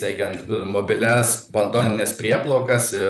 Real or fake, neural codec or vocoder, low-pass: fake; vocoder, 44.1 kHz, 128 mel bands, Pupu-Vocoder; 14.4 kHz